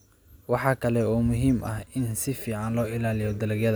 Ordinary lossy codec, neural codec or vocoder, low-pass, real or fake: none; none; none; real